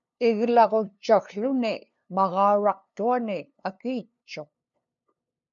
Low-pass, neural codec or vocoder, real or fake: 7.2 kHz; codec, 16 kHz, 2 kbps, FunCodec, trained on LibriTTS, 25 frames a second; fake